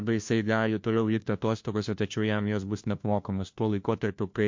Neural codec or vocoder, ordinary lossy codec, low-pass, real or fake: codec, 16 kHz, 1 kbps, FunCodec, trained on LibriTTS, 50 frames a second; MP3, 48 kbps; 7.2 kHz; fake